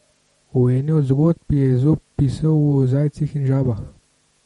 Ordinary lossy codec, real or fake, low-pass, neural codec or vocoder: MP3, 48 kbps; fake; 19.8 kHz; vocoder, 48 kHz, 128 mel bands, Vocos